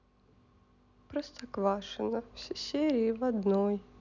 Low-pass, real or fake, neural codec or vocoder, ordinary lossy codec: 7.2 kHz; real; none; none